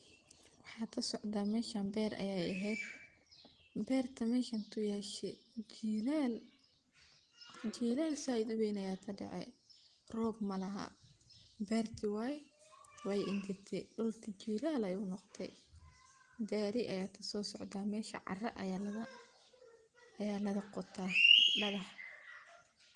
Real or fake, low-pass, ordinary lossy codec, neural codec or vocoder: real; 10.8 kHz; Opus, 24 kbps; none